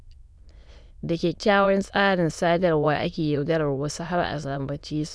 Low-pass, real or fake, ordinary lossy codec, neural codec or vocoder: none; fake; none; autoencoder, 22.05 kHz, a latent of 192 numbers a frame, VITS, trained on many speakers